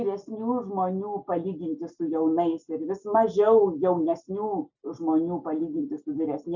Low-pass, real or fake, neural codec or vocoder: 7.2 kHz; real; none